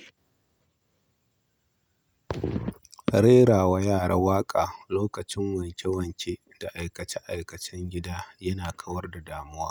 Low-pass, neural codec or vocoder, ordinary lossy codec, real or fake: none; none; none; real